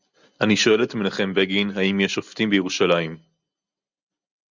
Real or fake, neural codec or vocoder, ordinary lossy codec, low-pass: real; none; Opus, 64 kbps; 7.2 kHz